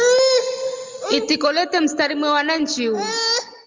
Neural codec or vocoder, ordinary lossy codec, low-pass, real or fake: none; Opus, 32 kbps; 7.2 kHz; real